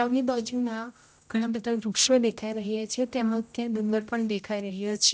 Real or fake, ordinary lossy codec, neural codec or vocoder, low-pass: fake; none; codec, 16 kHz, 0.5 kbps, X-Codec, HuBERT features, trained on general audio; none